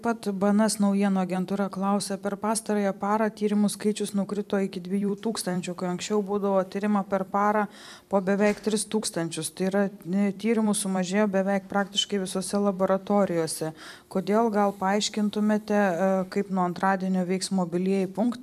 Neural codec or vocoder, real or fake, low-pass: vocoder, 44.1 kHz, 128 mel bands every 256 samples, BigVGAN v2; fake; 14.4 kHz